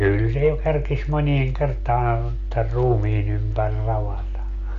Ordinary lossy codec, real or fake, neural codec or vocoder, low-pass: none; real; none; 7.2 kHz